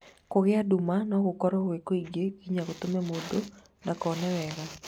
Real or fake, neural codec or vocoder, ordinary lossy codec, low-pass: real; none; none; 19.8 kHz